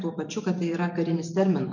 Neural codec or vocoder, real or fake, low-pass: vocoder, 44.1 kHz, 128 mel bands every 512 samples, BigVGAN v2; fake; 7.2 kHz